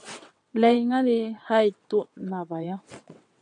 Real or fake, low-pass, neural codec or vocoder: fake; 9.9 kHz; vocoder, 22.05 kHz, 80 mel bands, WaveNeXt